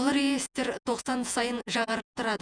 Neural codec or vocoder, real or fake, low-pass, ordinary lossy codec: vocoder, 48 kHz, 128 mel bands, Vocos; fake; 9.9 kHz; none